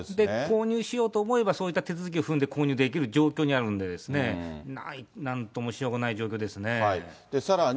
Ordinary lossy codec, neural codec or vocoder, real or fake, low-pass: none; none; real; none